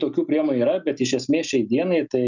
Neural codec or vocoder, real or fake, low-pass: none; real; 7.2 kHz